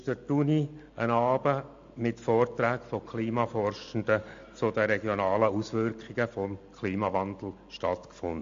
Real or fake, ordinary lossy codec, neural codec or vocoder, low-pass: real; MP3, 48 kbps; none; 7.2 kHz